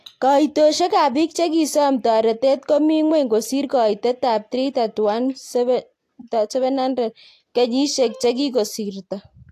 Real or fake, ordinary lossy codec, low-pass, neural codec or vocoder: real; AAC, 64 kbps; 14.4 kHz; none